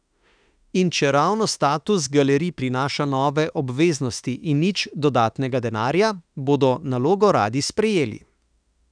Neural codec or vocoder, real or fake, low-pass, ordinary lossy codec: autoencoder, 48 kHz, 32 numbers a frame, DAC-VAE, trained on Japanese speech; fake; 9.9 kHz; none